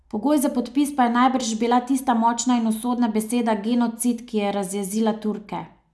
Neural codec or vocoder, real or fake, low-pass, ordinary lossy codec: none; real; none; none